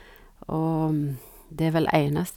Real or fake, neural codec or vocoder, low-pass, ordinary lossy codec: real; none; 19.8 kHz; none